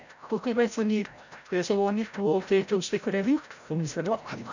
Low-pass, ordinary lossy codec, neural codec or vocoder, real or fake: 7.2 kHz; none; codec, 16 kHz, 0.5 kbps, FreqCodec, larger model; fake